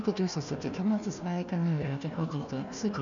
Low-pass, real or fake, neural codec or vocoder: 7.2 kHz; fake; codec, 16 kHz, 1 kbps, FunCodec, trained on Chinese and English, 50 frames a second